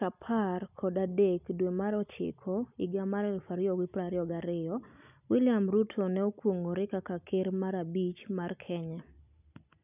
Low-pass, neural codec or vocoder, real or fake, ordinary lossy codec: 3.6 kHz; none; real; none